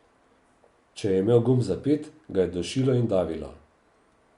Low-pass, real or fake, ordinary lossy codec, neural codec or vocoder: 10.8 kHz; real; Opus, 64 kbps; none